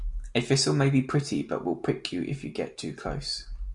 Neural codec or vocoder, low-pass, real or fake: none; 10.8 kHz; real